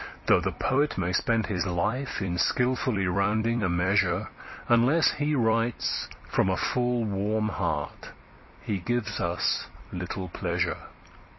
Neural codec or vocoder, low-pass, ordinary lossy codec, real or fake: vocoder, 44.1 kHz, 128 mel bands every 256 samples, BigVGAN v2; 7.2 kHz; MP3, 24 kbps; fake